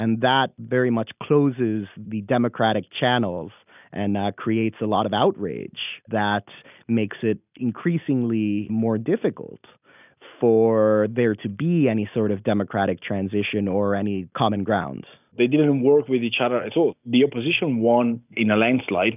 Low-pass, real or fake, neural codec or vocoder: 3.6 kHz; real; none